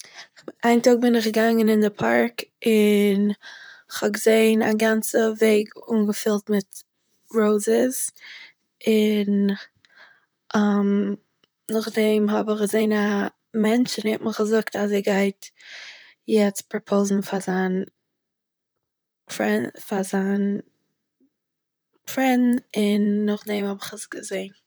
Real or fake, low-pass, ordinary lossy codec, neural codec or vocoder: fake; none; none; vocoder, 44.1 kHz, 128 mel bands, Pupu-Vocoder